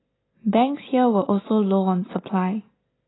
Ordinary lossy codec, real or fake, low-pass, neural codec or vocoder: AAC, 16 kbps; real; 7.2 kHz; none